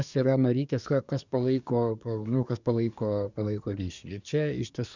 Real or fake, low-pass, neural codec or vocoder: fake; 7.2 kHz; codec, 24 kHz, 1 kbps, SNAC